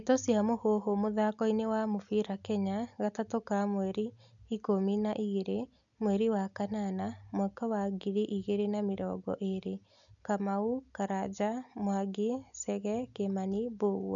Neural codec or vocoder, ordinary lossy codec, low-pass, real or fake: none; none; 7.2 kHz; real